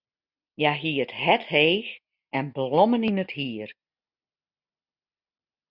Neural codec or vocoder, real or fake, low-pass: none; real; 5.4 kHz